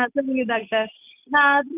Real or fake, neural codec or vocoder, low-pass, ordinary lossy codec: real; none; 3.6 kHz; none